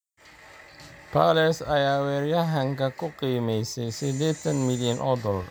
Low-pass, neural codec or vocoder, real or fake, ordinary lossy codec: none; none; real; none